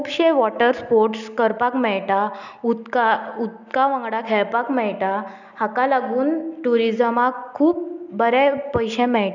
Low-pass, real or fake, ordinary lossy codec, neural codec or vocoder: 7.2 kHz; real; none; none